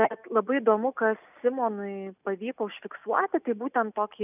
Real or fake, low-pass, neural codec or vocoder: real; 3.6 kHz; none